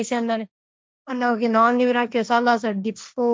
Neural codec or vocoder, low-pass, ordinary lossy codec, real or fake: codec, 16 kHz, 1.1 kbps, Voila-Tokenizer; none; none; fake